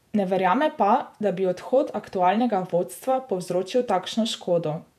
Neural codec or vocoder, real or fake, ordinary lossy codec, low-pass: vocoder, 48 kHz, 128 mel bands, Vocos; fake; none; 14.4 kHz